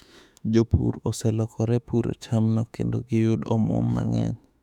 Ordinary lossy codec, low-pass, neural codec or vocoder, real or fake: none; 19.8 kHz; autoencoder, 48 kHz, 32 numbers a frame, DAC-VAE, trained on Japanese speech; fake